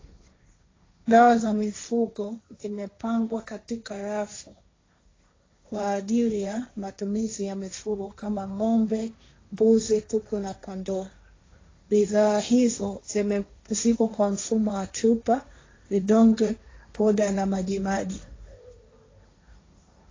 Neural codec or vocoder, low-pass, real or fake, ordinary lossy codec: codec, 16 kHz, 1.1 kbps, Voila-Tokenizer; 7.2 kHz; fake; AAC, 32 kbps